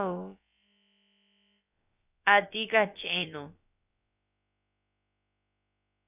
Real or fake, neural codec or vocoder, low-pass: fake; codec, 16 kHz, about 1 kbps, DyCAST, with the encoder's durations; 3.6 kHz